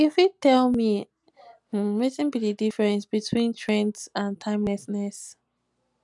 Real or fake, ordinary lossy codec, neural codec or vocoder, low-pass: real; none; none; 10.8 kHz